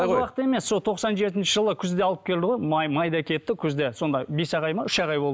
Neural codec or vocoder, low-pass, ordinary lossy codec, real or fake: none; none; none; real